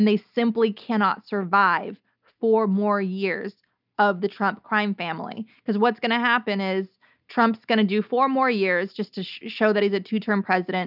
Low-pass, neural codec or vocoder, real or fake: 5.4 kHz; none; real